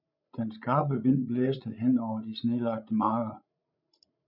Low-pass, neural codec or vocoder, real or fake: 5.4 kHz; codec, 16 kHz, 16 kbps, FreqCodec, larger model; fake